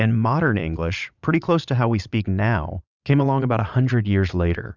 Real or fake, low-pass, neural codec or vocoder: fake; 7.2 kHz; vocoder, 22.05 kHz, 80 mel bands, Vocos